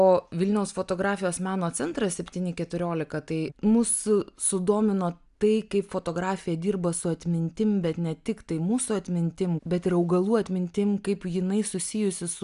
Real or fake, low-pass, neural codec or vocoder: real; 10.8 kHz; none